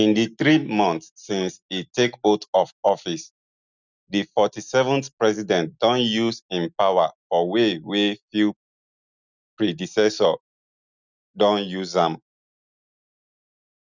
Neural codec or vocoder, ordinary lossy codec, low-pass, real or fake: none; none; 7.2 kHz; real